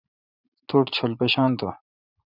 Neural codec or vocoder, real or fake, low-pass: vocoder, 44.1 kHz, 80 mel bands, Vocos; fake; 5.4 kHz